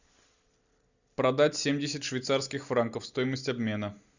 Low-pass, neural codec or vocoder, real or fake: 7.2 kHz; none; real